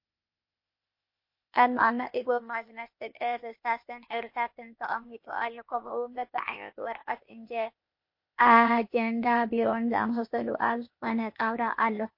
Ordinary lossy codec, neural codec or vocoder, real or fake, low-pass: MP3, 32 kbps; codec, 16 kHz, 0.8 kbps, ZipCodec; fake; 5.4 kHz